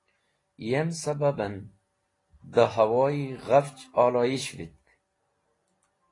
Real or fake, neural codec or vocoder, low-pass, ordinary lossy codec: real; none; 10.8 kHz; AAC, 32 kbps